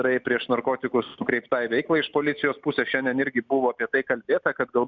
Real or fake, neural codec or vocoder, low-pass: real; none; 7.2 kHz